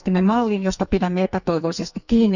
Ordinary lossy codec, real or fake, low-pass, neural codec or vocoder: none; fake; 7.2 kHz; codec, 44.1 kHz, 2.6 kbps, SNAC